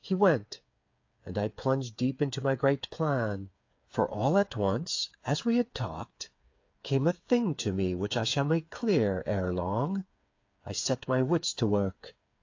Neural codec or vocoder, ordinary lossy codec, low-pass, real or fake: codec, 16 kHz, 8 kbps, FreqCodec, smaller model; AAC, 48 kbps; 7.2 kHz; fake